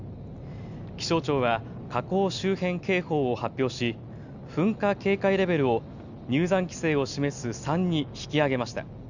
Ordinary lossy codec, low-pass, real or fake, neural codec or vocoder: none; 7.2 kHz; real; none